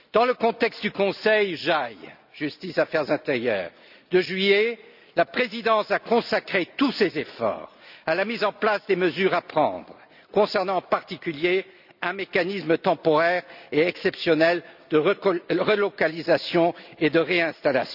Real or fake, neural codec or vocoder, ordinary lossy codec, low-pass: real; none; none; 5.4 kHz